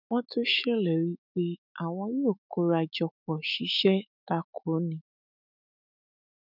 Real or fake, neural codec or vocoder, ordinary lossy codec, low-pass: fake; autoencoder, 48 kHz, 128 numbers a frame, DAC-VAE, trained on Japanese speech; none; 5.4 kHz